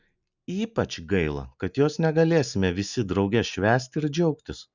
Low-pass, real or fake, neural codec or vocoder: 7.2 kHz; real; none